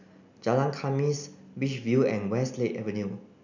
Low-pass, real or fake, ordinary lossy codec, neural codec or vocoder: 7.2 kHz; real; none; none